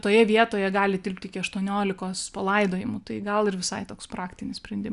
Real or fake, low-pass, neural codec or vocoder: real; 10.8 kHz; none